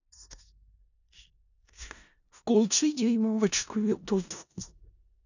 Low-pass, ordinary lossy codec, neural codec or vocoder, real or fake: 7.2 kHz; none; codec, 16 kHz in and 24 kHz out, 0.4 kbps, LongCat-Audio-Codec, four codebook decoder; fake